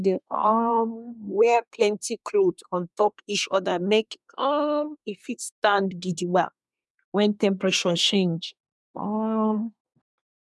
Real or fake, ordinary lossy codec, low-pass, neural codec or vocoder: fake; none; none; codec, 24 kHz, 1 kbps, SNAC